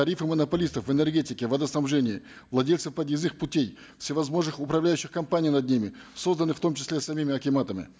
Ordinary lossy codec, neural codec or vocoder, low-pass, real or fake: none; none; none; real